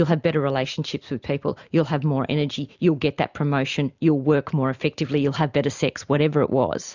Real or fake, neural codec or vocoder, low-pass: real; none; 7.2 kHz